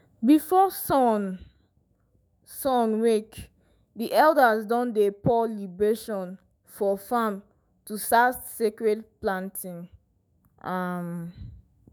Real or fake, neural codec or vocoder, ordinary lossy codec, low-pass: fake; autoencoder, 48 kHz, 128 numbers a frame, DAC-VAE, trained on Japanese speech; none; none